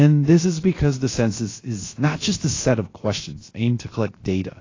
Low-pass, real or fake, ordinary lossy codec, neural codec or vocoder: 7.2 kHz; fake; AAC, 32 kbps; codec, 16 kHz, 0.7 kbps, FocalCodec